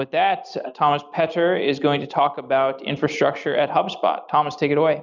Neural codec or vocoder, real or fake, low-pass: none; real; 7.2 kHz